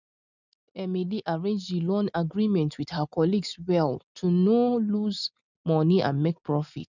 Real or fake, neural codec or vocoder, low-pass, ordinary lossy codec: real; none; 7.2 kHz; none